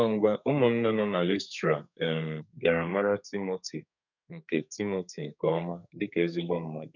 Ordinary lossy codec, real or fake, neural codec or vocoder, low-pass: none; fake; codec, 32 kHz, 1.9 kbps, SNAC; 7.2 kHz